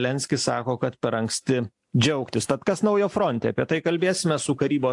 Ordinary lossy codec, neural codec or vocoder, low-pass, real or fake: AAC, 64 kbps; none; 10.8 kHz; real